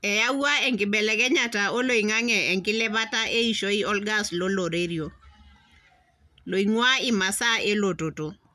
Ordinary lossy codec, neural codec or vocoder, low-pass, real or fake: none; none; 14.4 kHz; real